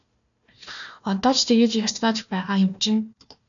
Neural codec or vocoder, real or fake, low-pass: codec, 16 kHz, 1 kbps, FunCodec, trained on Chinese and English, 50 frames a second; fake; 7.2 kHz